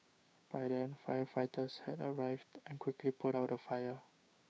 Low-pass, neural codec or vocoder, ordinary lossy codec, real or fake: none; codec, 16 kHz, 6 kbps, DAC; none; fake